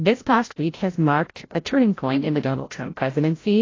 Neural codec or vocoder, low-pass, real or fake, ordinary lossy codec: codec, 16 kHz, 0.5 kbps, FreqCodec, larger model; 7.2 kHz; fake; AAC, 32 kbps